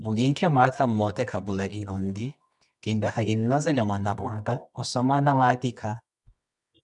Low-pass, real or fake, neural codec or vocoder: 10.8 kHz; fake; codec, 24 kHz, 0.9 kbps, WavTokenizer, medium music audio release